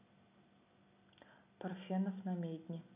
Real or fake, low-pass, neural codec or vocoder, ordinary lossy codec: real; 3.6 kHz; none; none